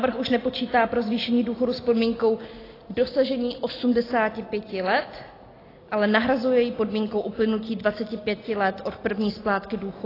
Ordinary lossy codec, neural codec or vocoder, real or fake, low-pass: AAC, 24 kbps; none; real; 5.4 kHz